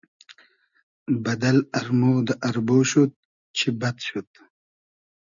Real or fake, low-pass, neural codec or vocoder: real; 7.2 kHz; none